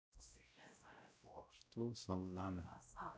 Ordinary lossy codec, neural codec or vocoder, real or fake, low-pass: none; codec, 16 kHz, 0.5 kbps, X-Codec, WavLM features, trained on Multilingual LibriSpeech; fake; none